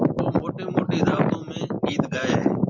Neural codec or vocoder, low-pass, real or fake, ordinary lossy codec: vocoder, 44.1 kHz, 128 mel bands every 512 samples, BigVGAN v2; 7.2 kHz; fake; MP3, 64 kbps